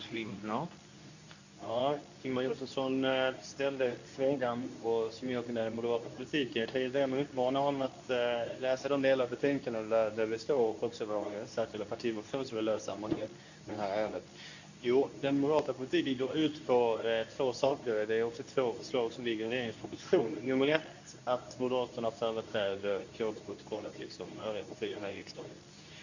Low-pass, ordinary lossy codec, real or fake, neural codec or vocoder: 7.2 kHz; none; fake; codec, 24 kHz, 0.9 kbps, WavTokenizer, medium speech release version 2